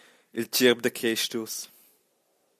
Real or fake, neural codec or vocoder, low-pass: real; none; 14.4 kHz